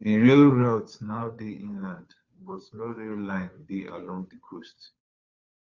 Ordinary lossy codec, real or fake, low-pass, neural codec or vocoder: Opus, 64 kbps; fake; 7.2 kHz; codec, 16 kHz, 2 kbps, FunCodec, trained on Chinese and English, 25 frames a second